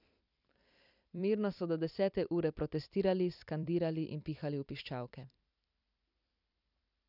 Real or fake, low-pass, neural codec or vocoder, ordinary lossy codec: fake; 5.4 kHz; vocoder, 44.1 kHz, 128 mel bands every 256 samples, BigVGAN v2; none